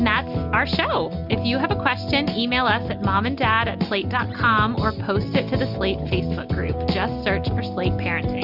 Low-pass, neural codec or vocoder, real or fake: 5.4 kHz; none; real